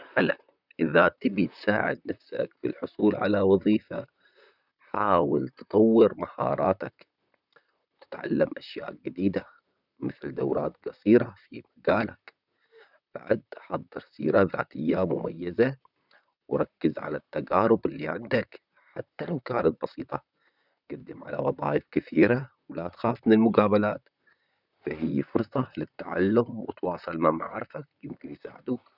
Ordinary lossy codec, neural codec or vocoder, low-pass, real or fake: none; vocoder, 44.1 kHz, 128 mel bands, Pupu-Vocoder; 5.4 kHz; fake